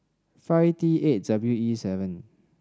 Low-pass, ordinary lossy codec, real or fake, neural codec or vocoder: none; none; real; none